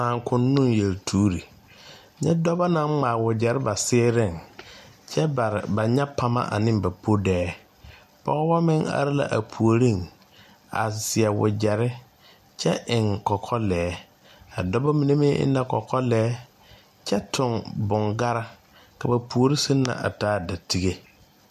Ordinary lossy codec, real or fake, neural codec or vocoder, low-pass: MP3, 96 kbps; real; none; 14.4 kHz